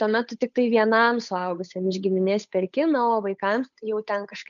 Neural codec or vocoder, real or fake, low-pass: codec, 16 kHz, 8 kbps, FunCodec, trained on Chinese and English, 25 frames a second; fake; 7.2 kHz